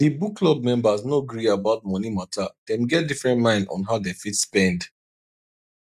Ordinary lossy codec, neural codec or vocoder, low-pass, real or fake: none; none; 14.4 kHz; real